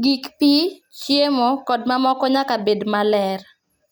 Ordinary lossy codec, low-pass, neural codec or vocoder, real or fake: none; none; none; real